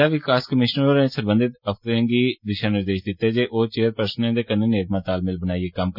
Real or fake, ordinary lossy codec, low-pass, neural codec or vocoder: real; none; 5.4 kHz; none